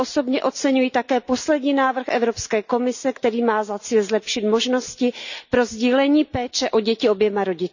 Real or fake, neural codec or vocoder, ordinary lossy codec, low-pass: real; none; none; 7.2 kHz